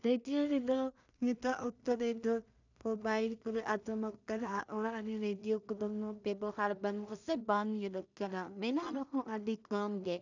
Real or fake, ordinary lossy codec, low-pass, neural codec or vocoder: fake; none; 7.2 kHz; codec, 16 kHz in and 24 kHz out, 0.4 kbps, LongCat-Audio-Codec, two codebook decoder